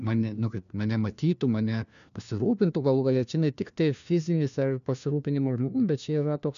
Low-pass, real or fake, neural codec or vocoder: 7.2 kHz; fake; codec, 16 kHz, 1 kbps, FunCodec, trained on LibriTTS, 50 frames a second